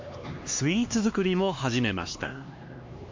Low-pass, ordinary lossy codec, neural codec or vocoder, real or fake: 7.2 kHz; MP3, 48 kbps; codec, 16 kHz, 2 kbps, X-Codec, HuBERT features, trained on LibriSpeech; fake